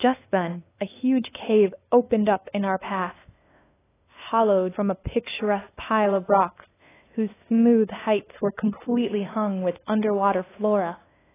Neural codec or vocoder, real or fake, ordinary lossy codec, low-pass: codec, 16 kHz, 2 kbps, FunCodec, trained on LibriTTS, 25 frames a second; fake; AAC, 16 kbps; 3.6 kHz